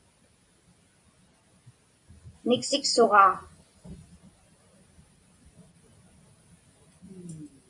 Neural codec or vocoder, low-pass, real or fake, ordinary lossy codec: none; 10.8 kHz; real; MP3, 48 kbps